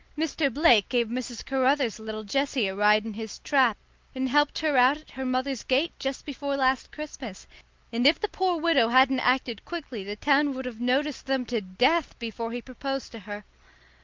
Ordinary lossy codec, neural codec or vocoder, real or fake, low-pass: Opus, 32 kbps; none; real; 7.2 kHz